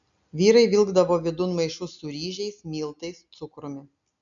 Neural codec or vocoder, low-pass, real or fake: none; 7.2 kHz; real